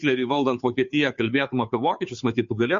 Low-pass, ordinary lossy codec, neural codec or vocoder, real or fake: 7.2 kHz; MP3, 48 kbps; codec, 16 kHz, 2 kbps, FunCodec, trained on Chinese and English, 25 frames a second; fake